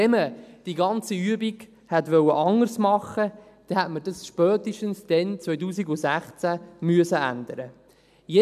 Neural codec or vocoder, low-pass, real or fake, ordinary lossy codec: none; 14.4 kHz; real; none